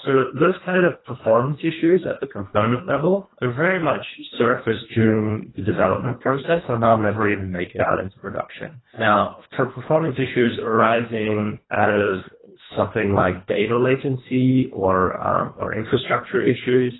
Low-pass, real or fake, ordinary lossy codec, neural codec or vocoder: 7.2 kHz; fake; AAC, 16 kbps; codec, 24 kHz, 1.5 kbps, HILCodec